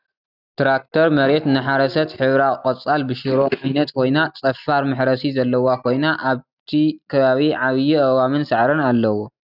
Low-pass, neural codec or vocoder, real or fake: 5.4 kHz; none; real